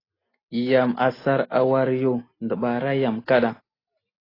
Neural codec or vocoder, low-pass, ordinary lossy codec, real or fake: none; 5.4 kHz; AAC, 24 kbps; real